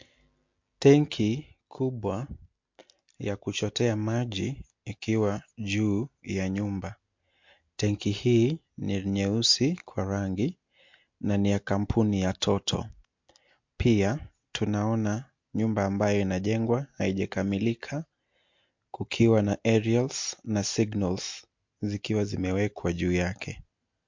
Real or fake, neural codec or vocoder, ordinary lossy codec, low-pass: real; none; MP3, 48 kbps; 7.2 kHz